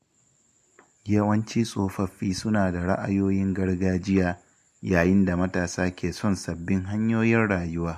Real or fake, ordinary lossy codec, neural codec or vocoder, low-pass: real; MP3, 64 kbps; none; 14.4 kHz